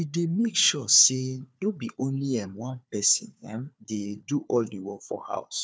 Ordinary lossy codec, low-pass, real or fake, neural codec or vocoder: none; none; fake; codec, 16 kHz, 4 kbps, FunCodec, trained on Chinese and English, 50 frames a second